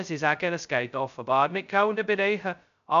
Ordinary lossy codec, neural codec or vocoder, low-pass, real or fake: none; codec, 16 kHz, 0.2 kbps, FocalCodec; 7.2 kHz; fake